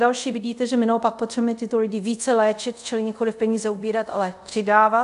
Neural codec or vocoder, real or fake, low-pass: codec, 24 kHz, 0.5 kbps, DualCodec; fake; 10.8 kHz